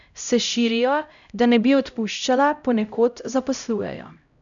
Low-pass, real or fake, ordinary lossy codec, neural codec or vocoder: 7.2 kHz; fake; none; codec, 16 kHz, 0.5 kbps, X-Codec, HuBERT features, trained on LibriSpeech